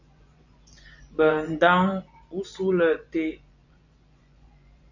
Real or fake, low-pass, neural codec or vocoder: fake; 7.2 kHz; vocoder, 24 kHz, 100 mel bands, Vocos